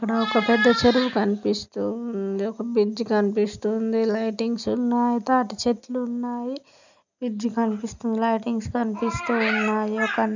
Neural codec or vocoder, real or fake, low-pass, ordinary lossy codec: none; real; 7.2 kHz; none